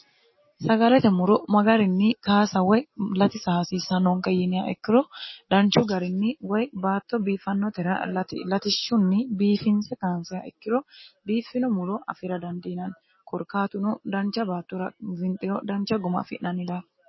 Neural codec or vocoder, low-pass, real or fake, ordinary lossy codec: none; 7.2 kHz; real; MP3, 24 kbps